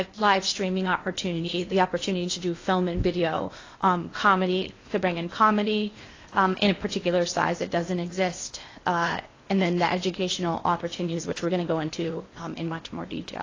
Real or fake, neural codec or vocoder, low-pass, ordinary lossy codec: fake; codec, 16 kHz in and 24 kHz out, 0.8 kbps, FocalCodec, streaming, 65536 codes; 7.2 kHz; AAC, 32 kbps